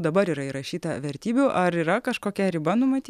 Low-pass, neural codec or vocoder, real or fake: 14.4 kHz; none; real